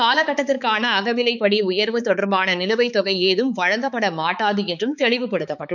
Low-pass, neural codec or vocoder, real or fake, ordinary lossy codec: 7.2 kHz; codec, 16 kHz, 4 kbps, X-Codec, HuBERT features, trained on balanced general audio; fake; none